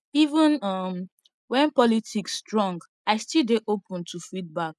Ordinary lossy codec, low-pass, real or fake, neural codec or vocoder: none; none; real; none